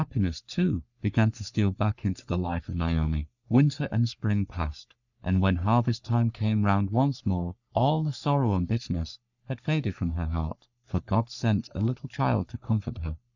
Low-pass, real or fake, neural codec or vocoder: 7.2 kHz; fake; codec, 44.1 kHz, 3.4 kbps, Pupu-Codec